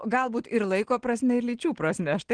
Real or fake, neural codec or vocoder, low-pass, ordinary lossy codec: real; none; 9.9 kHz; Opus, 16 kbps